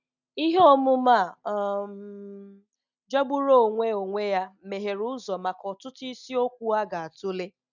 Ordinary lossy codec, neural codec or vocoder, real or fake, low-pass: none; none; real; 7.2 kHz